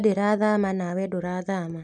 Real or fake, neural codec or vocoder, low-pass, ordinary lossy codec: real; none; 10.8 kHz; none